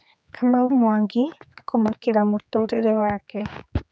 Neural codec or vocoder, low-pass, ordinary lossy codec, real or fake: codec, 16 kHz, 4 kbps, X-Codec, HuBERT features, trained on general audio; none; none; fake